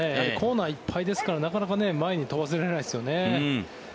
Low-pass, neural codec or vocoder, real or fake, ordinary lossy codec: none; none; real; none